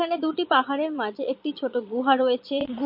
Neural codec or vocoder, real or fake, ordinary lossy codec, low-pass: vocoder, 22.05 kHz, 80 mel bands, Vocos; fake; MP3, 32 kbps; 5.4 kHz